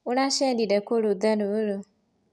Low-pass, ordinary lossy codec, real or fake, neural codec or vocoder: none; none; real; none